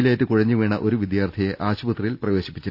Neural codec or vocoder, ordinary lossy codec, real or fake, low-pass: none; none; real; 5.4 kHz